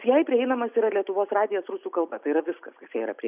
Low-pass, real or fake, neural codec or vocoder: 3.6 kHz; real; none